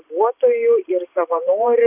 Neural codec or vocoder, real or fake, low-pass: vocoder, 44.1 kHz, 128 mel bands every 512 samples, BigVGAN v2; fake; 3.6 kHz